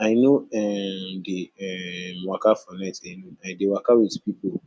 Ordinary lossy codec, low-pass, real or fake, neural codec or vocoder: none; none; real; none